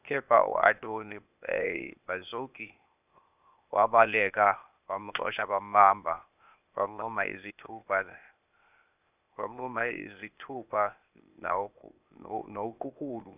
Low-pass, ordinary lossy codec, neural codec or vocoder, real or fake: 3.6 kHz; none; codec, 16 kHz, 0.8 kbps, ZipCodec; fake